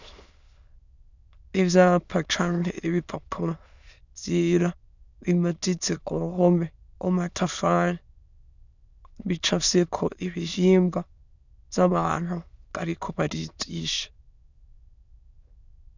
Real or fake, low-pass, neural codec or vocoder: fake; 7.2 kHz; autoencoder, 22.05 kHz, a latent of 192 numbers a frame, VITS, trained on many speakers